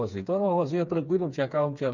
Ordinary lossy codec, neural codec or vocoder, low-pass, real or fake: none; codec, 16 kHz, 4 kbps, FreqCodec, smaller model; 7.2 kHz; fake